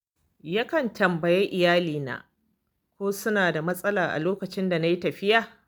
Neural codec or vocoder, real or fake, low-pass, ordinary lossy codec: none; real; none; none